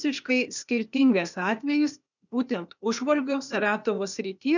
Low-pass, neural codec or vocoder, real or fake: 7.2 kHz; codec, 16 kHz, 0.8 kbps, ZipCodec; fake